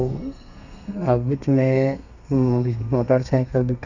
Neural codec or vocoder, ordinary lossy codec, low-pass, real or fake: codec, 32 kHz, 1.9 kbps, SNAC; none; 7.2 kHz; fake